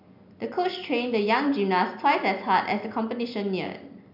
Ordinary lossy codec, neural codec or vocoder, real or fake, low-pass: none; none; real; 5.4 kHz